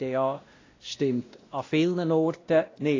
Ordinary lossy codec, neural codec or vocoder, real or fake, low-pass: AAC, 32 kbps; codec, 16 kHz, 1 kbps, X-Codec, WavLM features, trained on Multilingual LibriSpeech; fake; 7.2 kHz